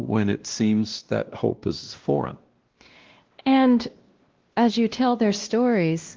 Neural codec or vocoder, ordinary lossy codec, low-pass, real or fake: codec, 16 kHz, 1 kbps, X-Codec, WavLM features, trained on Multilingual LibriSpeech; Opus, 16 kbps; 7.2 kHz; fake